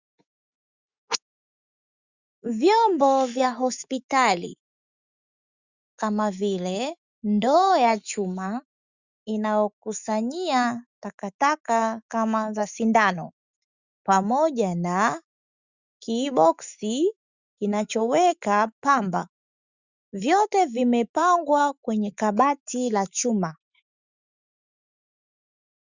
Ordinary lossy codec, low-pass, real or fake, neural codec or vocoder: Opus, 64 kbps; 7.2 kHz; fake; autoencoder, 48 kHz, 128 numbers a frame, DAC-VAE, trained on Japanese speech